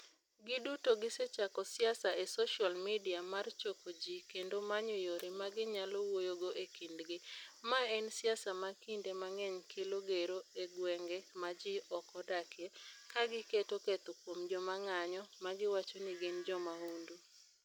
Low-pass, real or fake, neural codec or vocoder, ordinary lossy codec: 19.8 kHz; real; none; none